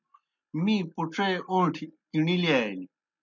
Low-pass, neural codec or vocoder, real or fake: 7.2 kHz; none; real